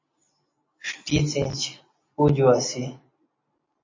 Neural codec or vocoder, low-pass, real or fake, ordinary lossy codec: none; 7.2 kHz; real; MP3, 32 kbps